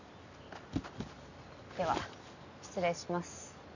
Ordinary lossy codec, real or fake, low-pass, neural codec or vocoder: none; real; 7.2 kHz; none